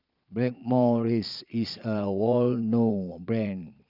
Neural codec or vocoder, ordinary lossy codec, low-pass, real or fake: vocoder, 22.05 kHz, 80 mel bands, Vocos; none; 5.4 kHz; fake